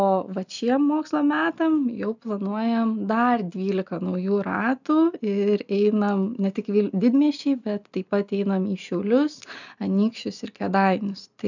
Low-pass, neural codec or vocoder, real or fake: 7.2 kHz; none; real